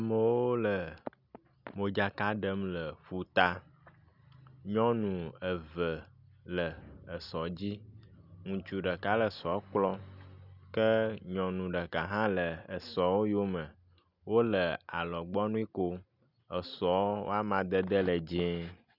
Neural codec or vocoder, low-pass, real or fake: none; 5.4 kHz; real